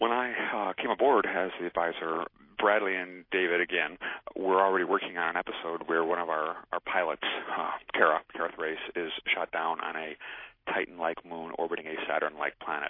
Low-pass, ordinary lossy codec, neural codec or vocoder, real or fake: 5.4 kHz; MP3, 24 kbps; none; real